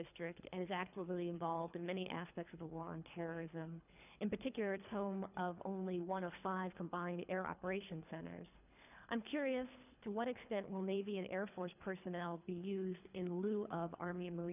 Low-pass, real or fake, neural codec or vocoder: 3.6 kHz; fake; codec, 24 kHz, 3 kbps, HILCodec